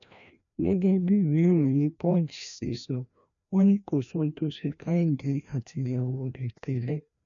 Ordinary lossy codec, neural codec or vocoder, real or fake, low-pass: none; codec, 16 kHz, 1 kbps, FreqCodec, larger model; fake; 7.2 kHz